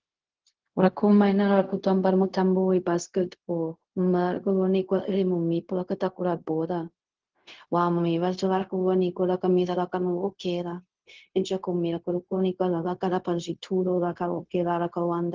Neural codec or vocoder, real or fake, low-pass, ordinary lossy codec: codec, 16 kHz, 0.4 kbps, LongCat-Audio-Codec; fake; 7.2 kHz; Opus, 16 kbps